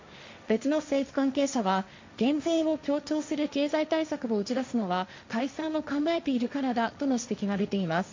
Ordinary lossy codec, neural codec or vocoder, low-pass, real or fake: MP3, 48 kbps; codec, 16 kHz, 1.1 kbps, Voila-Tokenizer; 7.2 kHz; fake